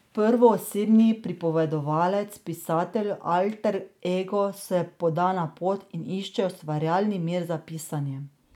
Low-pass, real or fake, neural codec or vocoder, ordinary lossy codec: 19.8 kHz; real; none; none